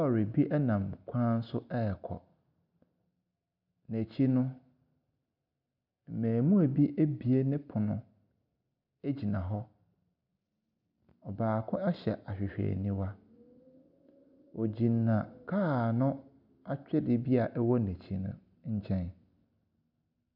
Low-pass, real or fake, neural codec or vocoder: 5.4 kHz; real; none